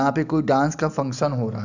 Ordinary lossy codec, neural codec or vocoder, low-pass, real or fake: none; none; 7.2 kHz; real